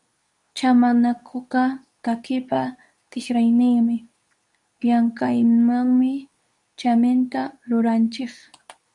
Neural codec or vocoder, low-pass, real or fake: codec, 24 kHz, 0.9 kbps, WavTokenizer, medium speech release version 2; 10.8 kHz; fake